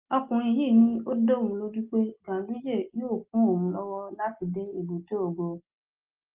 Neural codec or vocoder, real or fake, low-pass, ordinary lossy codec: none; real; 3.6 kHz; Opus, 32 kbps